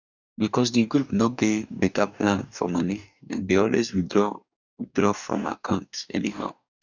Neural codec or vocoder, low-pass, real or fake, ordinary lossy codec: codec, 44.1 kHz, 2.6 kbps, DAC; 7.2 kHz; fake; none